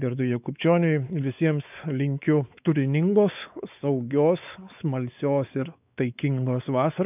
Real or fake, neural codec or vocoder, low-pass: fake; codec, 16 kHz, 4 kbps, X-Codec, WavLM features, trained on Multilingual LibriSpeech; 3.6 kHz